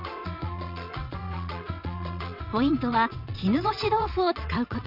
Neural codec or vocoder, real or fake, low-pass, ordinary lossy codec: vocoder, 22.05 kHz, 80 mel bands, WaveNeXt; fake; 5.4 kHz; none